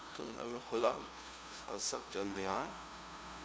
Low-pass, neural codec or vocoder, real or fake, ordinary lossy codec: none; codec, 16 kHz, 0.5 kbps, FunCodec, trained on LibriTTS, 25 frames a second; fake; none